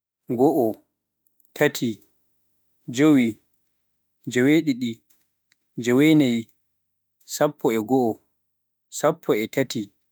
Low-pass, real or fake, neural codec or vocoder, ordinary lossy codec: none; fake; autoencoder, 48 kHz, 32 numbers a frame, DAC-VAE, trained on Japanese speech; none